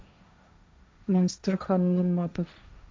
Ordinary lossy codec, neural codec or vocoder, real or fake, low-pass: none; codec, 16 kHz, 1.1 kbps, Voila-Tokenizer; fake; none